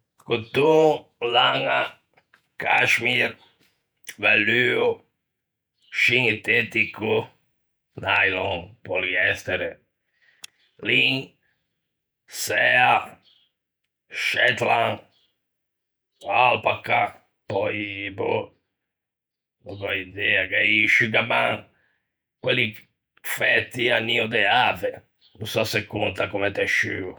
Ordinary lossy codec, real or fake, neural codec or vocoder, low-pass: none; fake; vocoder, 48 kHz, 128 mel bands, Vocos; none